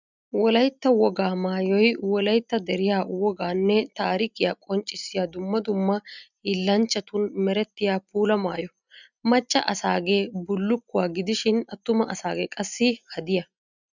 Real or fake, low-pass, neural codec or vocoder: real; 7.2 kHz; none